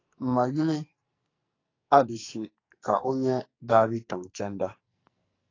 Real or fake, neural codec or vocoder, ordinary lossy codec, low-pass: fake; codec, 44.1 kHz, 2.6 kbps, SNAC; AAC, 32 kbps; 7.2 kHz